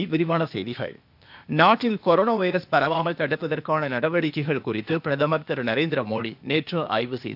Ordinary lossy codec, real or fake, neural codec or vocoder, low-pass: none; fake; codec, 16 kHz, 0.8 kbps, ZipCodec; 5.4 kHz